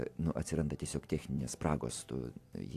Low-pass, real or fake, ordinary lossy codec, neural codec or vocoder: 14.4 kHz; fake; AAC, 64 kbps; vocoder, 44.1 kHz, 128 mel bands every 256 samples, BigVGAN v2